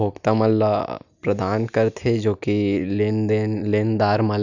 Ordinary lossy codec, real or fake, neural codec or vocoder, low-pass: none; real; none; 7.2 kHz